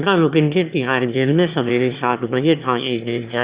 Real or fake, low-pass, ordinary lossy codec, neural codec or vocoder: fake; 3.6 kHz; Opus, 64 kbps; autoencoder, 22.05 kHz, a latent of 192 numbers a frame, VITS, trained on one speaker